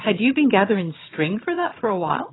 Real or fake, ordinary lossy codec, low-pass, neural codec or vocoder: fake; AAC, 16 kbps; 7.2 kHz; vocoder, 22.05 kHz, 80 mel bands, HiFi-GAN